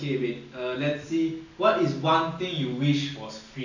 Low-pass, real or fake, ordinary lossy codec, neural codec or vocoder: 7.2 kHz; real; none; none